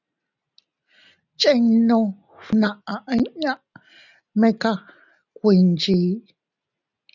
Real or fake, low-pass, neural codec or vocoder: real; 7.2 kHz; none